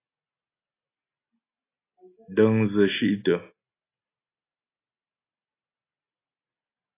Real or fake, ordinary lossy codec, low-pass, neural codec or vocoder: real; AAC, 24 kbps; 3.6 kHz; none